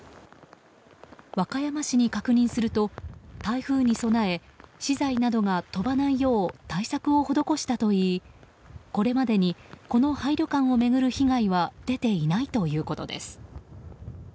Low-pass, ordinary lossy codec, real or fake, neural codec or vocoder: none; none; real; none